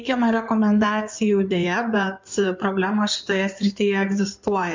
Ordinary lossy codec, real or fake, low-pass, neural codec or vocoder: MP3, 64 kbps; fake; 7.2 kHz; codec, 16 kHz in and 24 kHz out, 2.2 kbps, FireRedTTS-2 codec